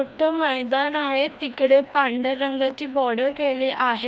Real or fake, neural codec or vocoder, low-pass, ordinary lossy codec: fake; codec, 16 kHz, 1 kbps, FreqCodec, larger model; none; none